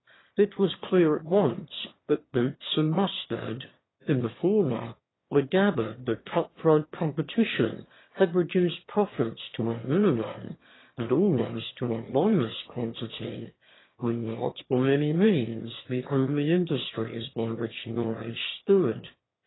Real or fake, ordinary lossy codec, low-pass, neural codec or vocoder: fake; AAC, 16 kbps; 7.2 kHz; autoencoder, 22.05 kHz, a latent of 192 numbers a frame, VITS, trained on one speaker